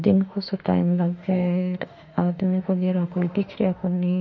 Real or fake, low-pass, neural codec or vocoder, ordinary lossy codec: fake; 7.2 kHz; autoencoder, 48 kHz, 32 numbers a frame, DAC-VAE, trained on Japanese speech; none